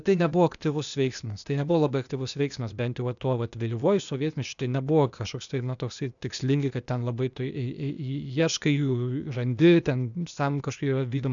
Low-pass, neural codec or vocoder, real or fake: 7.2 kHz; codec, 16 kHz, 0.8 kbps, ZipCodec; fake